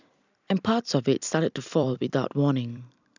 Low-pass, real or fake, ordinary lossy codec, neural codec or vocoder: 7.2 kHz; fake; none; vocoder, 44.1 kHz, 128 mel bands every 256 samples, BigVGAN v2